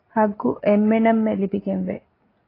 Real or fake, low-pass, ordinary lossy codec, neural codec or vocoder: real; 5.4 kHz; AAC, 24 kbps; none